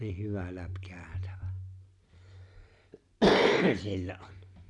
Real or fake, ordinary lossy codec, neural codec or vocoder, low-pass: real; none; none; 10.8 kHz